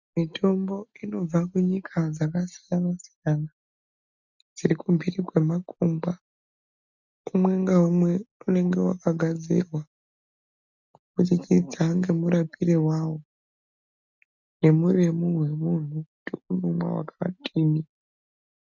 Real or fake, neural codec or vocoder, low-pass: real; none; 7.2 kHz